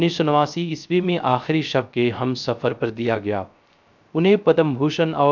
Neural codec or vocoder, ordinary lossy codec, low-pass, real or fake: codec, 16 kHz, 0.3 kbps, FocalCodec; none; 7.2 kHz; fake